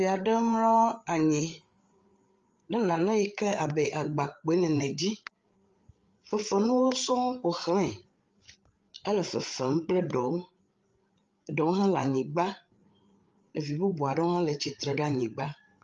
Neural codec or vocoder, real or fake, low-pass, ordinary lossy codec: codec, 16 kHz, 8 kbps, FreqCodec, larger model; fake; 7.2 kHz; Opus, 32 kbps